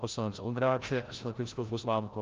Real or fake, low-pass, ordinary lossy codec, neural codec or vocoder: fake; 7.2 kHz; Opus, 32 kbps; codec, 16 kHz, 0.5 kbps, FreqCodec, larger model